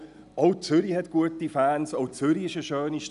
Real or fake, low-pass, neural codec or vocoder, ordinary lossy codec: real; 10.8 kHz; none; none